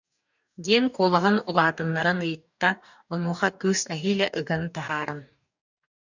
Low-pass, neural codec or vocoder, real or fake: 7.2 kHz; codec, 44.1 kHz, 2.6 kbps, DAC; fake